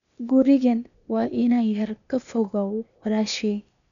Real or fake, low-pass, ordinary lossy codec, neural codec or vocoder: fake; 7.2 kHz; none; codec, 16 kHz, 0.8 kbps, ZipCodec